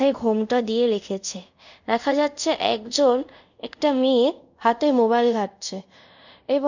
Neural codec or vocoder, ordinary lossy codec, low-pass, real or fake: codec, 24 kHz, 0.5 kbps, DualCodec; none; 7.2 kHz; fake